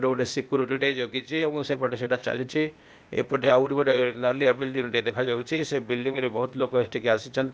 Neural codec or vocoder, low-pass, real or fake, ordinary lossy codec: codec, 16 kHz, 0.8 kbps, ZipCodec; none; fake; none